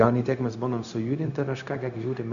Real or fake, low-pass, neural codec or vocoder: fake; 7.2 kHz; codec, 16 kHz, 0.4 kbps, LongCat-Audio-Codec